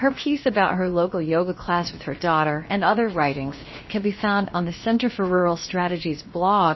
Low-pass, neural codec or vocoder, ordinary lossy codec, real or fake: 7.2 kHz; codec, 16 kHz, about 1 kbps, DyCAST, with the encoder's durations; MP3, 24 kbps; fake